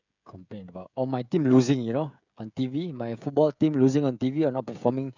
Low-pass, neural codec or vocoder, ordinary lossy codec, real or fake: 7.2 kHz; codec, 16 kHz, 16 kbps, FreqCodec, smaller model; none; fake